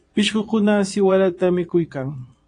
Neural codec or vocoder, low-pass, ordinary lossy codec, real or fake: vocoder, 22.05 kHz, 80 mel bands, Vocos; 9.9 kHz; AAC, 48 kbps; fake